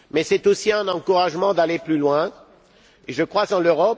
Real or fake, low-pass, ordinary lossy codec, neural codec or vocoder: real; none; none; none